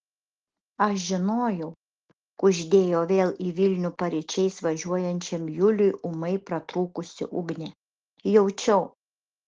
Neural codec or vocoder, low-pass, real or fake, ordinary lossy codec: none; 7.2 kHz; real; Opus, 16 kbps